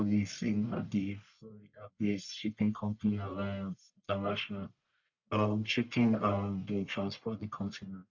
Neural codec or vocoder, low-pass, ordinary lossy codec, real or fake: codec, 44.1 kHz, 1.7 kbps, Pupu-Codec; 7.2 kHz; none; fake